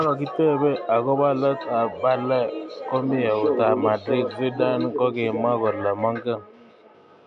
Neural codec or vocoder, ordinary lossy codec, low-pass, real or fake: none; none; 9.9 kHz; real